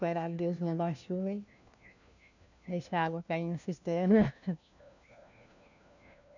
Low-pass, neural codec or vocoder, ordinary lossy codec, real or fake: 7.2 kHz; codec, 16 kHz, 1 kbps, FunCodec, trained on LibriTTS, 50 frames a second; none; fake